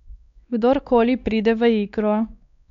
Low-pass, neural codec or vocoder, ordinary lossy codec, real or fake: 7.2 kHz; codec, 16 kHz, 2 kbps, X-Codec, WavLM features, trained on Multilingual LibriSpeech; none; fake